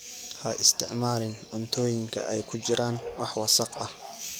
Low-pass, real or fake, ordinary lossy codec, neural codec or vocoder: none; fake; none; codec, 44.1 kHz, 7.8 kbps, DAC